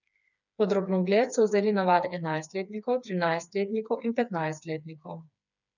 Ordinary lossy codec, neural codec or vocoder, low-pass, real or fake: none; codec, 16 kHz, 4 kbps, FreqCodec, smaller model; 7.2 kHz; fake